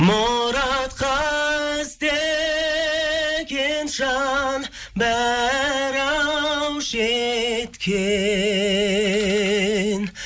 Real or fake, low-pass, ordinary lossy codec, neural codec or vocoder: real; none; none; none